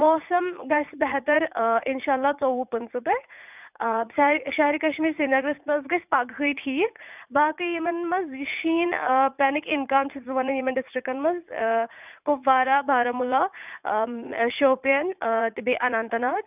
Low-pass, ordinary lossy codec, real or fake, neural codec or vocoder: 3.6 kHz; none; fake; vocoder, 44.1 kHz, 80 mel bands, Vocos